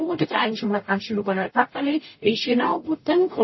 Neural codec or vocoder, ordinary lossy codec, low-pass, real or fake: codec, 44.1 kHz, 0.9 kbps, DAC; MP3, 24 kbps; 7.2 kHz; fake